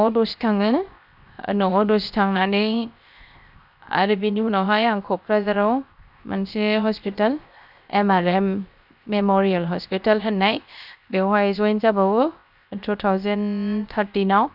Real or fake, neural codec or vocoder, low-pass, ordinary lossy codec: fake; codec, 16 kHz, 0.7 kbps, FocalCodec; 5.4 kHz; none